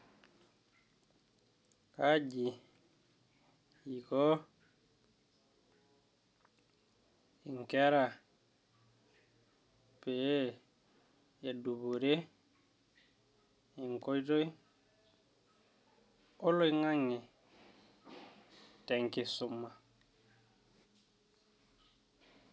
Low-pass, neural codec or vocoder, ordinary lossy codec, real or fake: none; none; none; real